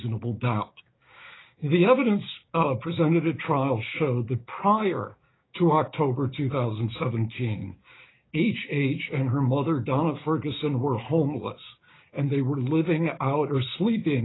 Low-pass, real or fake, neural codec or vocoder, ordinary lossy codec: 7.2 kHz; fake; codec, 16 kHz, 6 kbps, DAC; AAC, 16 kbps